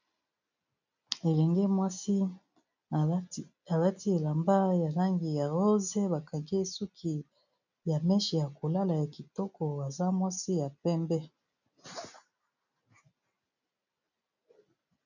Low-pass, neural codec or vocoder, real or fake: 7.2 kHz; none; real